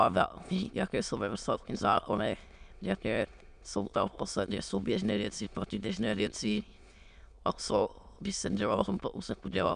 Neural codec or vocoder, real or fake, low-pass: autoencoder, 22.05 kHz, a latent of 192 numbers a frame, VITS, trained on many speakers; fake; 9.9 kHz